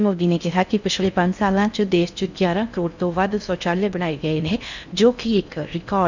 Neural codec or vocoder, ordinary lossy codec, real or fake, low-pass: codec, 16 kHz in and 24 kHz out, 0.6 kbps, FocalCodec, streaming, 4096 codes; none; fake; 7.2 kHz